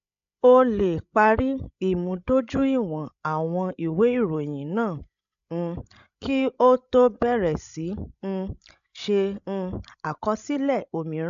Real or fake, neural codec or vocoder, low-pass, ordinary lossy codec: fake; codec, 16 kHz, 16 kbps, FreqCodec, larger model; 7.2 kHz; AAC, 96 kbps